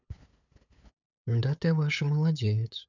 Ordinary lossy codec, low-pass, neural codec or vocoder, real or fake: none; 7.2 kHz; codec, 16 kHz, 8 kbps, FunCodec, trained on LibriTTS, 25 frames a second; fake